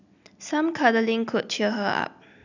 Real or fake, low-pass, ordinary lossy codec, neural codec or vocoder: real; 7.2 kHz; none; none